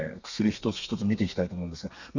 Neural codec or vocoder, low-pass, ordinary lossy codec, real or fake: codec, 32 kHz, 1.9 kbps, SNAC; 7.2 kHz; none; fake